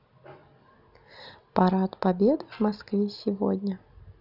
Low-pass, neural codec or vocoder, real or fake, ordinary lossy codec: 5.4 kHz; none; real; none